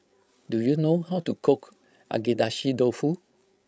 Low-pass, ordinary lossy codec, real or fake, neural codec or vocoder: none; none; fake; codec, 16 kHz, 16 kbps, FreqCodec, larger model